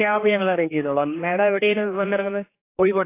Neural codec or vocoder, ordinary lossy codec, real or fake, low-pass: codec, 16 kHz, 2 kbps, X-Codec, HuBERT features, trained on general audio; AAC, 24 kbps; fake; 3.6 kHz